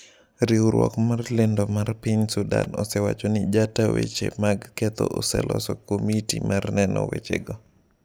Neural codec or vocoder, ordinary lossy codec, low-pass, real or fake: none; none; none; real